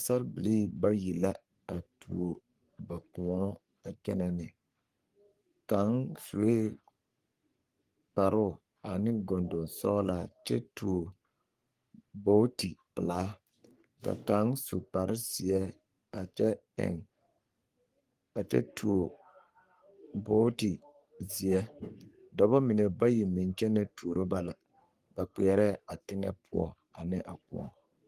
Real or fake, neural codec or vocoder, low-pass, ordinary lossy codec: fake; codec, 44.1 kHz, 3.4 kbps, Pupu-Codec; 14.4 kHz; Opus, 24 kbps